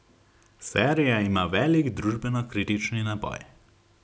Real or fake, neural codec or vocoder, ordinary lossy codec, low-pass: real; none; none; none